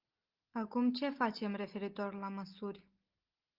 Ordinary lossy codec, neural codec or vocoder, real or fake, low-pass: Opus, 32 kbps; none; real; 5.4 kHz